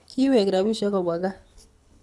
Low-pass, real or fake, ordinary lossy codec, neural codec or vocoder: none; fake; none; codec, 24 kHz, 6 kbps, HILCodec